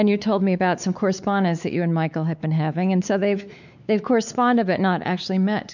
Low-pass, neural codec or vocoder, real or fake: 7.2 kHz; codec, 16 kHz, 4 kbps, X-Codec, WavLM features, trained on Multilingual LibriSpeech; fake